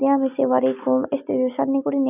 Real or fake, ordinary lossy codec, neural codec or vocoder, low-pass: real; none; none; 3.6 kHz